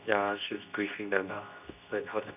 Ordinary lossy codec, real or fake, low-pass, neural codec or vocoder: none; fake; 3.6 kHz; codec, 24 kHz, 0.9 kbps, WavTokenizer, medium speech release version 2